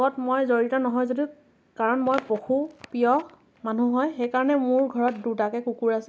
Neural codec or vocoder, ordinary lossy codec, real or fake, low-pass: none; none; real; none